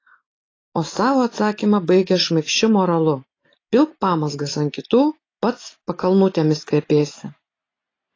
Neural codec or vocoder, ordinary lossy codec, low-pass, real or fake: none; AAC, 32 kbps; 7.2 kHz; real